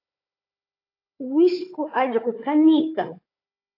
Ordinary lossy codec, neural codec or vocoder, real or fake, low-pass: AAC, 32 kbps; codec, 16 kHz, 4 kbps, FunCodec, trained on Chinese and English, 50 frames a second; fake; 5.4 kHz